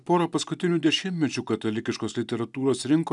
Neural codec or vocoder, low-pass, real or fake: none; 10.8 kHz; real